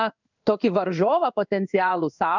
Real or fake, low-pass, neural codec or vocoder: fake; 7.2 kHz; codec, 16 kHz in and 24 kHz out, 1 kbps, XY-Tokenizer